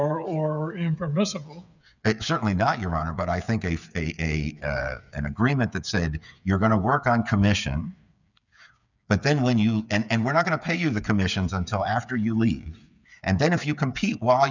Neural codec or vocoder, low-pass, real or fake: codec, 16 kHz, 8 kbps, FreqCodec, smaller model; 7.2 kHz; fake